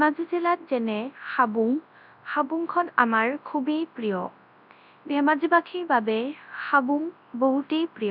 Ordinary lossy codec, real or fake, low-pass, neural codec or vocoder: none; fake; 5.4 kHz; codec, 24 kHz, 0.9 kbps, WavTokenizer, large speech release